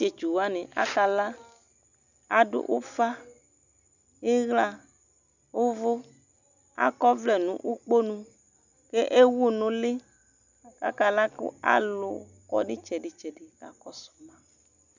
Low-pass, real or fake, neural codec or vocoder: 7.2 kHz; real; none